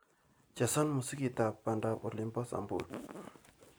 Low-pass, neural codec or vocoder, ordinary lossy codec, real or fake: none; none; none; real